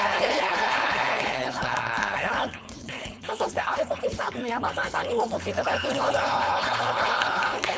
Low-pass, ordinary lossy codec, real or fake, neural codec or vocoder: none; none; fake; codec, 16 kHz, 4.8 kbps, FACodec